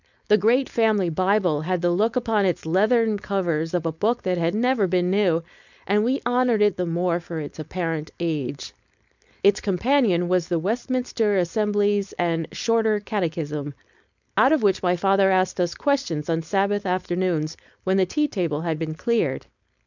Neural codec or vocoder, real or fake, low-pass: codec, 16 kHz, 4.8 kbps, FACodec; fake; 7.2 kHz